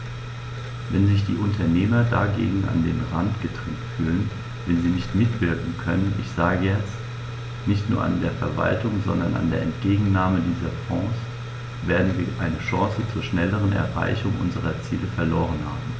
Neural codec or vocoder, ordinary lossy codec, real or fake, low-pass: none; none; real; none